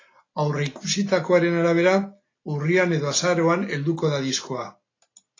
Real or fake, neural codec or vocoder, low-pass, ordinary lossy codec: real; none; 7.2 kHz; AAC, 32 kbps